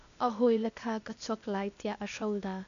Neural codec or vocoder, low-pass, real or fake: codec, 16 kHz, 0.8 kbps, ZipCodec; 7.2 kHz; fake